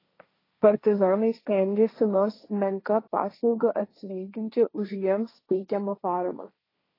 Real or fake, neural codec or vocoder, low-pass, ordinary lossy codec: fake; codec, 16 kHz, 1.1 kbps, Voila-Tokenizer; 5.4 kHz; AAC, 24 kbps